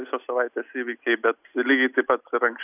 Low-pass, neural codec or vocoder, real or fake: 3.6 kHz; none; real